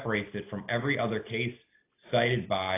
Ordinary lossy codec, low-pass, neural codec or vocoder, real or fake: AAC, 24 kbps; 3.6 kHz; none; real